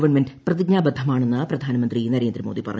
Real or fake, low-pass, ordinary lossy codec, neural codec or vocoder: real; none; none; none